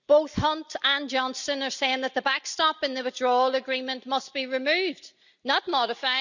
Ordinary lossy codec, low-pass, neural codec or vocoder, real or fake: none; 7.2 kHz; none; real